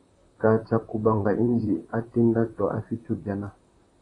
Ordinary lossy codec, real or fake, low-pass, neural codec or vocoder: AAC, 32 kbps; fake; 10.8 kHz; vocoder, 44.1 kHz, 128 mel bands, Pupu-Vocoder